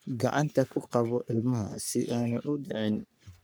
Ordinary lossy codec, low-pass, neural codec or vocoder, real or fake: none; none; codec, 44.1 kHz, 3.4 kbps, Pupu-Codec; fake